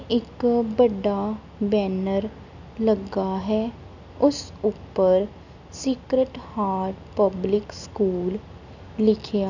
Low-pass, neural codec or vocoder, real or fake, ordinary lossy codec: 7.2 kHz; none; real; none